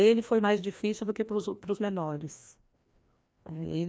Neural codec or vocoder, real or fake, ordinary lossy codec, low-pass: codec, 16 kHz, 1 kbps, FreqCodec, larger model; fake; none; none